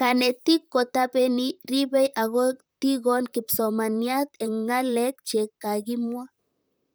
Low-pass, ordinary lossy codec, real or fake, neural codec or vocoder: none; none; fake; vocoder, 44.1 kHz, 128 mel bands, Pupu-Vocoder